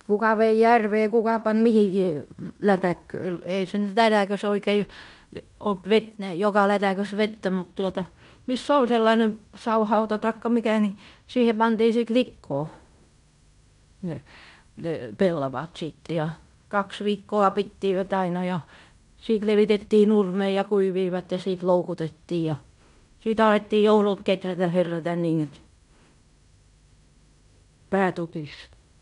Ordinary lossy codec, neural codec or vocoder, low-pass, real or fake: none; codec, 16 kHz in and 24 kHz out, 0.9 kbps, LongCat-Audio-Codec, fine tuned four codebook decoder; 10.8 kHz; fake